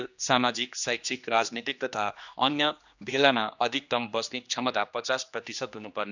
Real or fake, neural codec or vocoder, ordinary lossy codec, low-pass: fake; codec, 16 kHz, 2 kbps, X-Codec, HuBERT features, trained on general audio; none; 7.2 kHz